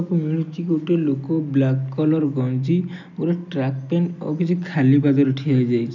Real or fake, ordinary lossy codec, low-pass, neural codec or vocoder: real; none; 7.2 kHz; none